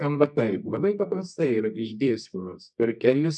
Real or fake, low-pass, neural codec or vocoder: fake; 10.8 kHz; codec, 24 kHz, 0.9 kbps, WavTokenizer, medium music audio release